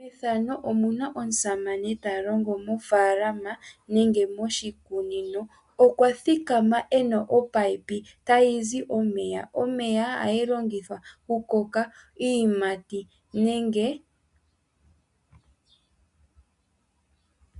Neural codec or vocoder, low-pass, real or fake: none; 10.8 kHz; real